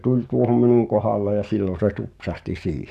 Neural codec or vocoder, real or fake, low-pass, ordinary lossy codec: codec, 44.1 kHz, 7.8 kbps, DAC; fake; 14.4 kHz; none